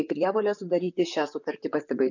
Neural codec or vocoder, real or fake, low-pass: vocoder, 44.1 kHz, 128 mel bands, Pupu-Vocoder; fake; 7.2 kHz